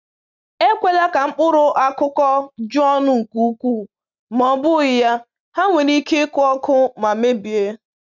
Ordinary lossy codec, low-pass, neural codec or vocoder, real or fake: none; 7.2 kHz; none; real